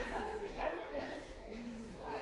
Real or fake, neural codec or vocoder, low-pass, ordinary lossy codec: fake; codec, 24 kHz, 1 kbps, SNAC; 10.8 kHz; AAC, 48 kbps